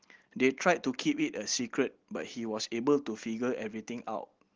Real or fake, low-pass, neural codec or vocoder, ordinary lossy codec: real; 7.2 kHz; none; Opus, 16 kbps